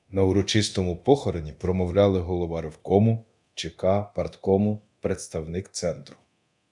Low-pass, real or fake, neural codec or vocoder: 10.8 kHz; fake; codec, 24 kHz, 0.9 kbps, DualCodec